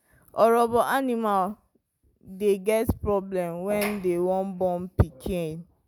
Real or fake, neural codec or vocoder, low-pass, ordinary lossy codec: real; none; none; none